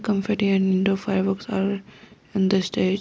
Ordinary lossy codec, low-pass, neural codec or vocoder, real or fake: none; none; none; real